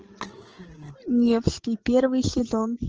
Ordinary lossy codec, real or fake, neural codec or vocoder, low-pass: Opus, 16 kbps; fake; codec, 16 kHz, 8 kbps, FreqCodec, larger model; 7.2 kHz